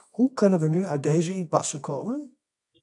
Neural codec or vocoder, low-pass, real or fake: codec, 24 kHz, 0.9 kbps, WavTokenizer, medium music audio release; 10.8 kHz; fake